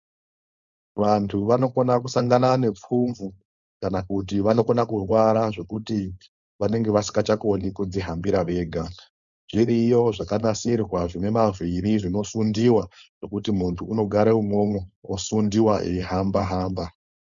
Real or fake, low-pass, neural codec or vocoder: fake; 7.2 kHz; codec, 16 kHz, 4.8 kbps, FACodec